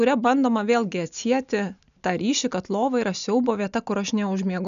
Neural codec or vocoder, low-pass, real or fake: none; 7.2 kHz; real